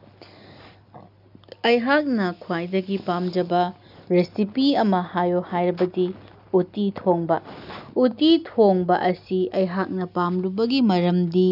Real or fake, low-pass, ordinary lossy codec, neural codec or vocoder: real; 5.4 kHz; none; none